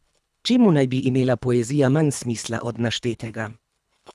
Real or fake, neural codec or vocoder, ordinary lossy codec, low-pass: fake; codec, 24 kHz, 3 kbps, HILCodec; none; none